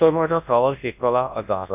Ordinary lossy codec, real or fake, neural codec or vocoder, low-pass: none; fake; codec, 24 kHz, 0.9 kbps, WavTokenizer, large speech release; 3.6 kHz